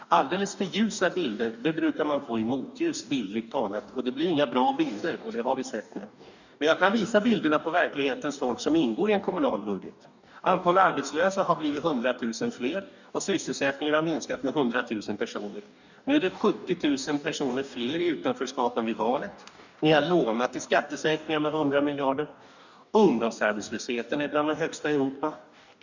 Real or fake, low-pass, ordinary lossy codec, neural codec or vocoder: fake; 7.2 kHz; none; codec, 44.1 kHz, 2.6 kbps, DAC